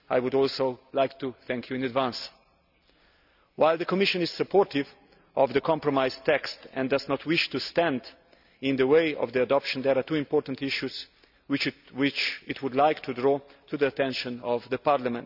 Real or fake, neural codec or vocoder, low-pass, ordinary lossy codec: real; none; 5.4 kHz; none